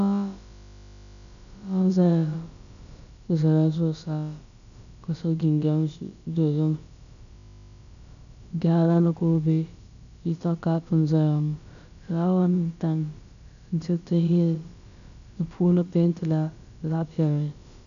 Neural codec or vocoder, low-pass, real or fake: codec, 16 kHz, about 1 kbps, DyCAST, with the encoder's durations; 7.2 kHz; fake